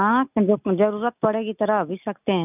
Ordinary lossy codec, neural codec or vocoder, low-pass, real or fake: none; none; 3.6 kHz; real